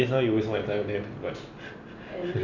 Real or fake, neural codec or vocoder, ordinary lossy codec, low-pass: real; none; none; 7.2 kHz